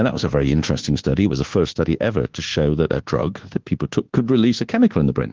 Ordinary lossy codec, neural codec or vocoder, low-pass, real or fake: Opus, 16 kbps; codec, 24 kHz, 1.2 kbps, DualCodec; 7.2 kHz; fake